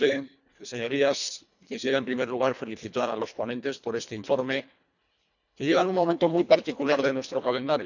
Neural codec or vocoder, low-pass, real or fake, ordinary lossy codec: codec, 24 kHz, 1.5 kbps, HILCodec; 7.2 kHz; fake; none